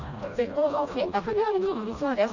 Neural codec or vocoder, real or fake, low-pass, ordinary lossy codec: codec, 16 kHz, 1 kbps, FreqCodec, smaller model; fake; 7.2 kHz; none